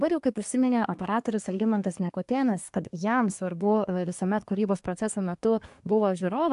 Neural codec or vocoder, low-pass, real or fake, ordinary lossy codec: codec, 24 kHz, 1 kbps, SNAC; 10.8 kHz; fake; AAC, 64 kbps